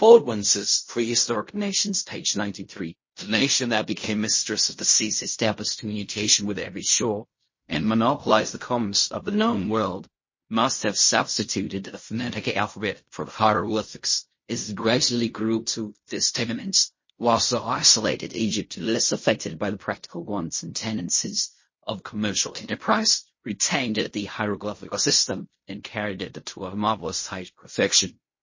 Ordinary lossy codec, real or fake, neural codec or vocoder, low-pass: MP3, 32 kbps; fake; codec, 16 kHz in and 24 kHz out, 0.4 kbps, LongCat-Audio-Codec, fine tuned four codebook decoder; 7.2 kHz